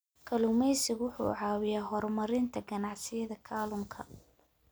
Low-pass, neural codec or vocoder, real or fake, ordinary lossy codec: none; none; real; none